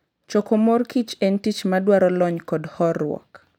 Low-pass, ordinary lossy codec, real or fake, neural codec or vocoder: 19.8 kHz; none; real; none